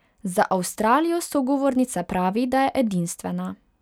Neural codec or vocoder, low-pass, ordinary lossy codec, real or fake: none; 19.8 kHz; none; real